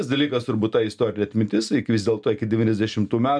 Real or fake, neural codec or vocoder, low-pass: real; none; 9.9 kHz